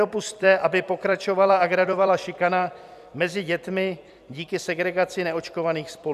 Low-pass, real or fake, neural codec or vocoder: 14.4 kHz; fake; vocoder, 44.1 kHz, 128 mel bands every 256 samples, BigVGAN v2